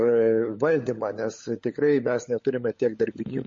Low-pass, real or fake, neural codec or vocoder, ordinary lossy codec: 7.2 kHz; fake; codec, 16 kHz, 16 kbps, FunCodec, trained on LibriTTS, 50 frames a second; MP3, 32 kbps